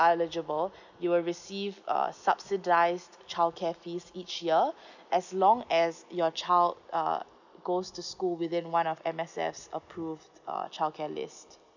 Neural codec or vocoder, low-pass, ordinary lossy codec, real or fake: none; 7.2 kHz; none; real